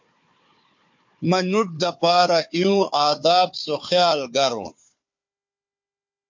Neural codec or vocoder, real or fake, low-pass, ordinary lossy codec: codec, 16 kHz, 4 kbps, FunCodec, trained on Chinese and English, 50 frames a second; fake; 7.2 kHz; MP3, 48 kbps